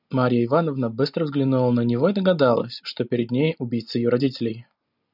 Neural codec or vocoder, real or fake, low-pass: none; real; 5.4 kHz